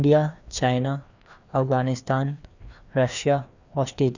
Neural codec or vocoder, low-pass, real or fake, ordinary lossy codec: codec, 16 kHz, 2 kbps, FreqCodec, larger model; 7.2 kHz; fake; none